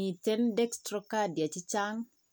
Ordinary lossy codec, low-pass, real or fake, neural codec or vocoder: none; none; real; none